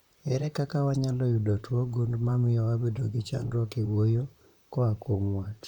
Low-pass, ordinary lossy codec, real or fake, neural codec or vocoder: 19.8 kHz; none; fake; vocoder, 44.1 kHz, 128 mel bands, Pupu-Vocoder